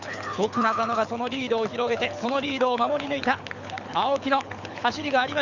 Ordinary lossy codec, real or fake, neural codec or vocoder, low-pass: none; fake; codec, 24 kHz, 6 kbps, HILCodec; 7.2 kHz